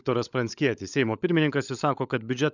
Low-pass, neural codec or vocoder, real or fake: 7.2 kHz; codec, 16 kHz, 16 kbps, FunCodec, trained on LibriTTS, 50 frames a second; fake